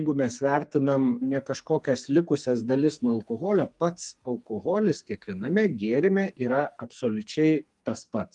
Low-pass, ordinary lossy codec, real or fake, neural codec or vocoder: 10.8 kHz; Opus, 32 kbps; fake; codec, 44.1 kHz, 2.6 kbps, SNAC